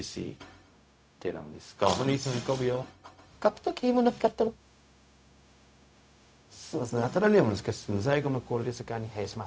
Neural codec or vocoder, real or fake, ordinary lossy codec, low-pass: codec, 16 kHz, 0.4 kbps, LongCat-Audio-Codec; fake; none; none